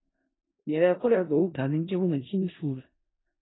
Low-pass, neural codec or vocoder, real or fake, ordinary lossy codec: 7.2 kHz; codec, 16 kHz in and 24 kHz out, 0.4 kbps, LongCat-Audio-Codec, four codebook decoder; fake; AAC, 16 kbps